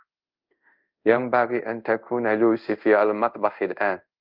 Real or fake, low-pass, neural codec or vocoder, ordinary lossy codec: fake; 5.4 kHz; codec, 24 kHz, 0.5 kbps, DualCodec; Opus, 24 kbps